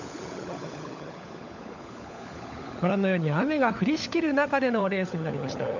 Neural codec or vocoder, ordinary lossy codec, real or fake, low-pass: codec, 16 kHz, 16 kbps, FunCodec, trained on LibriTTS, 50 frames a second; none; fake; 7.2 kHz